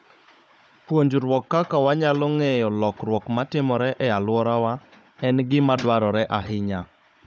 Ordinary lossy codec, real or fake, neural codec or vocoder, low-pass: none; fake; codec, 16 kHz, 16 kbps, FunCodec, trained on Chinese and English, 50 frames a second; none